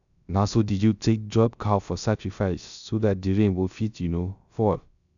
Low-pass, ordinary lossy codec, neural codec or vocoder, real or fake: 7.2 kHz; none; codec, 16 kHz, 0.3 kbps, FocalCodec; fake